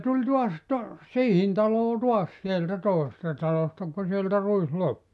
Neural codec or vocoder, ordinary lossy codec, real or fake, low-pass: none; none; real; none